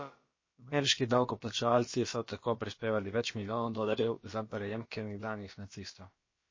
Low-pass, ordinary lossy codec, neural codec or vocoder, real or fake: 7.2 kHz; MP3, 32 kbps; codec, 16 kHz, about 1 kbps, DyCAST, with the encoder's durations; fake